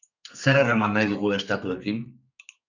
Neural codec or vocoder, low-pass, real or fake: codec, 32 kHz, 1.9 kbps, SNAC; 7.2 kHz; fake